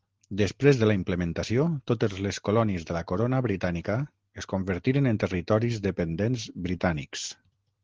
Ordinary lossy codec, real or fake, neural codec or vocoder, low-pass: Opus, 16 kbps; real; none; 7.2 kHz